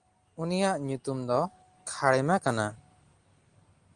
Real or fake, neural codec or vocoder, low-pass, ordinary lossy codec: real; none; 9.9 kHz; Opus, 24 kbps